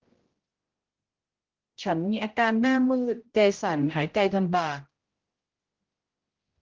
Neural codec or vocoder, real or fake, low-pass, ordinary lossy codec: codec, 16 kHz, 0.5 kbps, X-Codec, HuBERT features, trained on general audio; fake; 7.2 kHz; Opus, 16 kbps